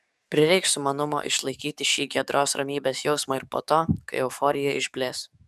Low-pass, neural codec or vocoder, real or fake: 14.4 kHz; codec, 44.1 kHz, 7.8 kbps, DAC; fake